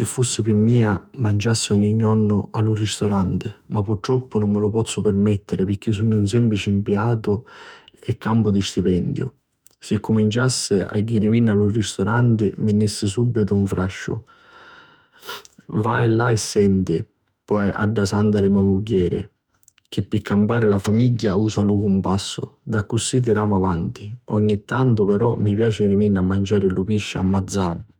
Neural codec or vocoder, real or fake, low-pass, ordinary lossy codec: autoencoder, 48 kHz, 32 numbers a frame, DAC-VAE, trained on Japanese speech; fake; 19.8 kHz; none